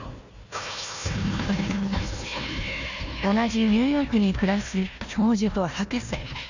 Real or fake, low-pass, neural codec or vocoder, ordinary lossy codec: fake; 7.2 kHz; codec, 16 kHz, 1 kbps, FunCodec, trained on Chinese and English, 50 frames a second; none